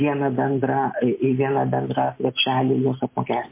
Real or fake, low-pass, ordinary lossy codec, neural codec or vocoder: real; 3.6 kHz; MP3, 24 kbps; none